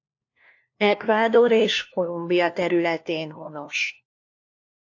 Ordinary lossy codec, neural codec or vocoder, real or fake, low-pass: AAC, 48 kbps; codec, 16 kHz, 1 kbps, FunCodec, trained on LibriTTS, 50 frames a second; fake; 7.2 kHz